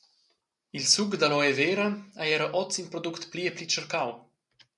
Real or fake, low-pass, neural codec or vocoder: real; 10.8 kHz; none